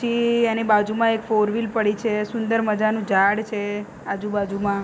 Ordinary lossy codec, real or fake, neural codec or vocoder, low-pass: none; real; none; none